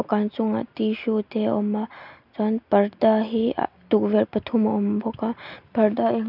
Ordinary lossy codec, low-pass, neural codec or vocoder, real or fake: none; 5.4 kHz; none; real